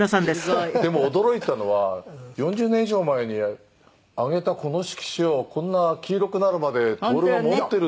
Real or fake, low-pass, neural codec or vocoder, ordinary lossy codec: real; none; none; none